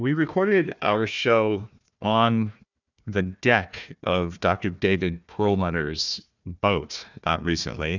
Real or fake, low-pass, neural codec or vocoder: fake; 7.2 kHz; codec, 16 kHz, 1 kbps, FunCodec, trained on Chinese and English, 50 frames a second